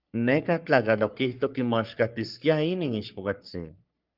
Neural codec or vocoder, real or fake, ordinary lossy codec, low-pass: codec, 44.1 kHz, 3.4 kbps, Pupu-Codec; fake; Opus, 24 kbps; 5.4 kHz